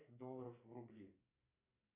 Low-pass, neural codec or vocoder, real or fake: 3.6 kHz; codec, 44.1 kHz, 2.6 kbps, SNAC; fake